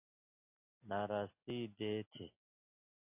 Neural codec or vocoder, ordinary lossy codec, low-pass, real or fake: none; AAC, 32 kbps; 3.6 kHz; real